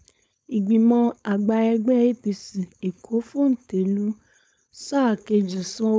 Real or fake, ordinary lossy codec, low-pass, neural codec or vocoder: fake; none; none; codec, 16 kHz, 4.8 kbps, FACodec